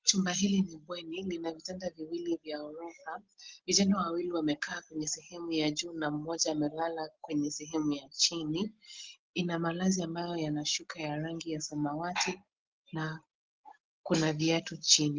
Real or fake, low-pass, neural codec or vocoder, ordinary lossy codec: real; 7.2 kHz; none; Opus, 16 kbps